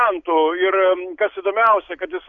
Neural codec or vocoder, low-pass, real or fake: none; 7.2 kHz; real